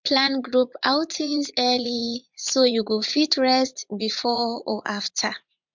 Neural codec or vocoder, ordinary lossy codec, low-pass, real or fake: vocoder, 44.1 kHz, 80 mel bands, Vocos; MP3, 64 kbps; 7.2 kHz; fake